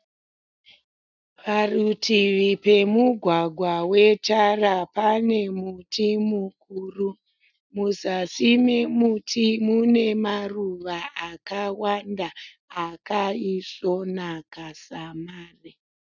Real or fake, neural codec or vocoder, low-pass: real; none; 7.2 kHz